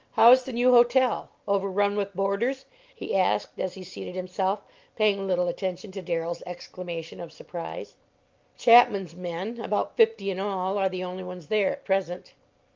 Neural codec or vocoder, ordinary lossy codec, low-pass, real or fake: none; Opus, 24 kbps; 7.2 kHz; real